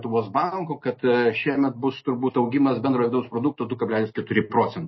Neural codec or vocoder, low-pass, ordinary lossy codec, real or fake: none; 7.2 kHz; MP3, 24 kbps; real